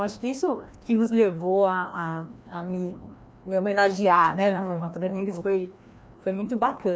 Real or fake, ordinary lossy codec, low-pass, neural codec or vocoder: fake; none; none; codec, 16 kHz, 1 kbps, FreqCodec, larger model